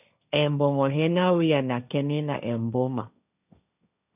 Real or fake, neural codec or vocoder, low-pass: fake; codec, 16 kHz, 1.1 kbps, Voila-Tokenizer; 3.6 kHz